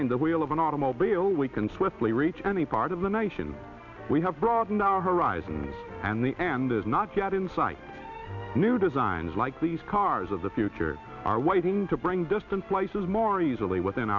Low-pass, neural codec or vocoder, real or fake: 7.2 kHz; none; real